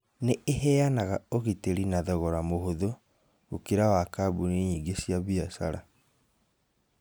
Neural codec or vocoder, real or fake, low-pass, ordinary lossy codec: none; real; none; none